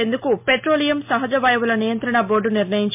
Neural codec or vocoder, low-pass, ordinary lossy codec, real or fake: none; 3.6 kHz; MP3, 32 kbps; real